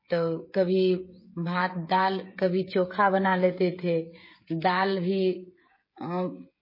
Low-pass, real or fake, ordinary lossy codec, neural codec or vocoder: 5.4 kHz; fake; MP3, 24 kbps; codec, 16 kHz, 16 kbps, FreqCodec, smaller model